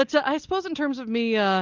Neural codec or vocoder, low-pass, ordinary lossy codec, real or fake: none; 7.2 kHz; Opus, 24 kbps; real